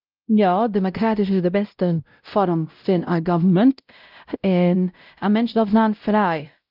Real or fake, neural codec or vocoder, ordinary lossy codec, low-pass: fake; codec, 16 kHz, 0.5 kbps, X-Codec, WavLM features, trained on Multilingual LibriSpeech; Opus, 24 kbps; 5.4 kHz